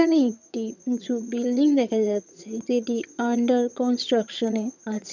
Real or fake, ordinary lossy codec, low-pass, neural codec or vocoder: fake; none; 7.2 kHz; vocoder, 22.05 kHz, 80 mel bands, HiFi-GAN